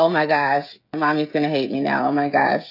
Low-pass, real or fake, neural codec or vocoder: 5.4 kHz; fake; codec, 16 kHz, 16 kbps, FreqCodec, smaller model